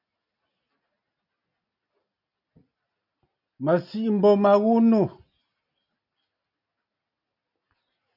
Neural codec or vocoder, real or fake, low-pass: none; real; 5.4 kHz